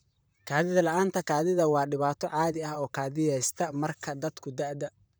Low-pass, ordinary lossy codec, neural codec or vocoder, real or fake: none; none; vocoder, 44.1 kHz, 128 mel bands, Pupu-Vocoder; fake